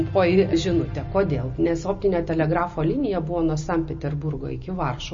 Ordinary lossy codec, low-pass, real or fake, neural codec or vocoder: MP3, 32 kbps; 7.2 kHz; real; none